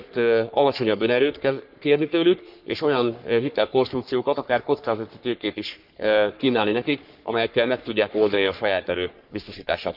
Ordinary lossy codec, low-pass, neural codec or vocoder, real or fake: none; 5.4 kHz; codec, 44.1 kHz, 3.4 kbps, Pupu-Codec; fake